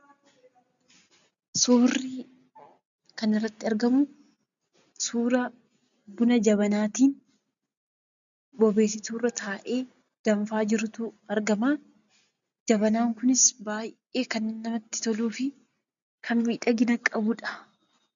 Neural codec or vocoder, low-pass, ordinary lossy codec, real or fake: none; 7.2 kHz; MP3, 96 kbps; real